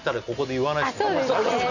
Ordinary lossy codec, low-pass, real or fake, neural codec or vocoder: none; 7.2 kHz; real; none